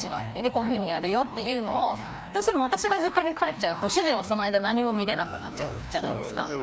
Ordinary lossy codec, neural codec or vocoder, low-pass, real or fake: none; codec, 16 kHz, 1 kbps, FreqCodec, larger model; none; fake